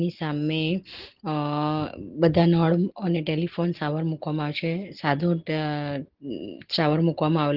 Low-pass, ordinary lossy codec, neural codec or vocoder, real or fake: 5.4 kHz; Opus, 16 kbps; none; real